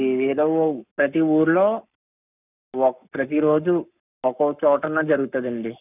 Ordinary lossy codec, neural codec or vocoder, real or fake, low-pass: none; codec, 44.1 kHz, 7.8 kbps, Pupu-Codec; fake; 3.6 kHz